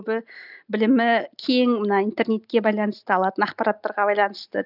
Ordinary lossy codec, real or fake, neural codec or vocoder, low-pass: none; real; none; 5.4 kHz